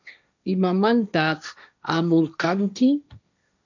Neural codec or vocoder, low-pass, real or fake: codec, 16 kHz, 1.1 kbps, Voila-Tokenizer; 7.2 kHz; fake